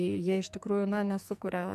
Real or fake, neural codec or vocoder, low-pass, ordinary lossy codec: fake; codec, 44.1 kHz, 2.6 kbps, SNAC; 14.4 kHz; MP3, 96 kbps